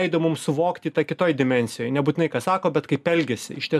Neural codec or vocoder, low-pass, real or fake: none; 14.4 kHz; real